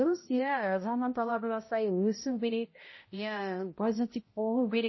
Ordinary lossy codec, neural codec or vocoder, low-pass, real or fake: MP3, 24 kbps; codec, 16 kHz, 0.5 kbps, X-Codec, HuBERT features, trained on balanced general audio; 7.2 kHz; fake